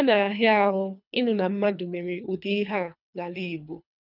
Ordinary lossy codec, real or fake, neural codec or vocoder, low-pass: none; fake; codec, 24 kHz, 3 kbps, HILCodec; 5.4 kHz